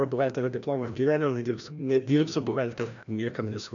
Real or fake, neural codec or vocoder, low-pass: fake; codec, 16 kHz, 1 kbps, FreqCodec, larger model; 7.2 kHz